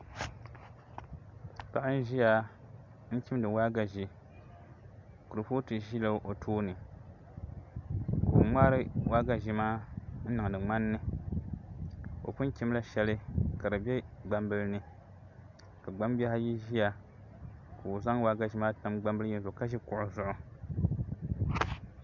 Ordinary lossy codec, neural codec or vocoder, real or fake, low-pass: MP3, 64 kbps; none; real; 7.2 kHz